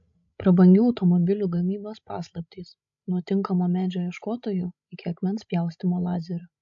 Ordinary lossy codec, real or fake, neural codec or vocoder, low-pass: MP3, 48 kbps; fake; codec, 16 kHz, 16 kbps, FreqCodec, larger model; 7.2 kHz